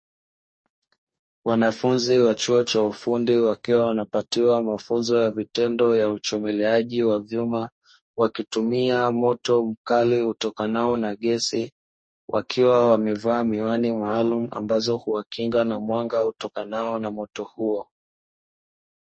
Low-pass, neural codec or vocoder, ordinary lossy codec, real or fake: 9.9 kHz; codec, 44.1 kHz, 2.6 kbps, DAC; MP3, 32 kbps; fake